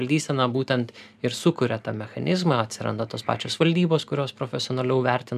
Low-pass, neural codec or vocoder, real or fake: 14.4 kHz; none; real